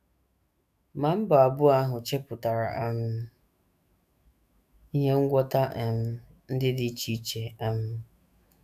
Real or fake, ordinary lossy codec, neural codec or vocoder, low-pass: fake; none; autoencoder, 48 kHz, 128 numbers a frame, DAC-VAE, trained on Japanese speech; 14.4 kHz